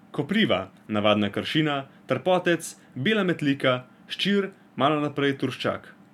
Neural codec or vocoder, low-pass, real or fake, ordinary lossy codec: none; 19.8 kHz; real; none